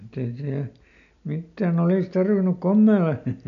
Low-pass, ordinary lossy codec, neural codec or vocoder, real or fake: 7.2 kHz; none; none; real